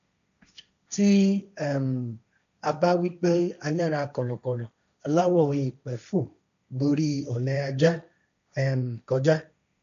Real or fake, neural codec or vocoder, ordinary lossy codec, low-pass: fake; codec, 16 kHz, 1.1 kbps, Voila-Tokenizer; none; 7.2 kHz